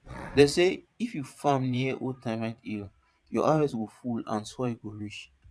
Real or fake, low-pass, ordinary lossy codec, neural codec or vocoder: fake; none; none; vocoder, 22.05 kHz, 80 mel bands, Vocos